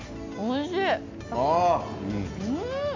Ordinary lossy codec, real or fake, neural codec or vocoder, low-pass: MP3, 48 kbps; real; none; 7.2 kHz